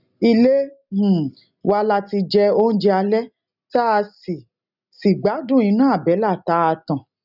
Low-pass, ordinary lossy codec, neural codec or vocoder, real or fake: 5.4 kHz; none; none; real